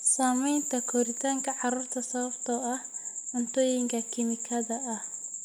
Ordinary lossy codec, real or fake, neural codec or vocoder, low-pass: none; real; none; none